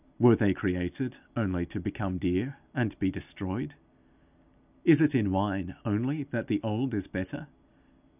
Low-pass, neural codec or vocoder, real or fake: 3.6 kHz; none; real